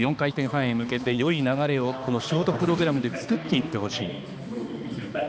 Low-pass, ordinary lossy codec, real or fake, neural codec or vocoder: none; none; fake; codec, 16 kHz, 2 kbps, X-Codec, HuBERT features, trained on balanced general audio